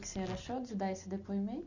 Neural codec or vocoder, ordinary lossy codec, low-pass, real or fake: none; none; 7.2 kHz; real